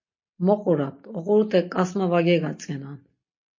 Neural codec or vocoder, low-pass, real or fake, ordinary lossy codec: none; 7.2 kHz; real; MP3, 32 kbps